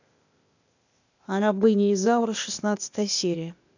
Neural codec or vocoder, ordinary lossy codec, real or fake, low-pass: codec, 16 kHz, 0.8 kbps, ZipCodec; none; fake; 7.2 kHz